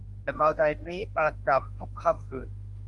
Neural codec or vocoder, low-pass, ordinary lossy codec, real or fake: autoencoder, 48 kHz, 32 numbers a frame, DAC-VAE, trained on Japanese speech; 10.8 kHz; Opus, 24 kbps; fake